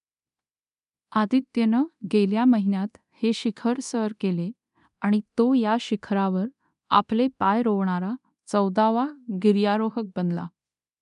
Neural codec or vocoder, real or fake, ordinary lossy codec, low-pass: codec, 24 kHz, 0.9 kbps, DualCodec; fake; none; 10.8 kHz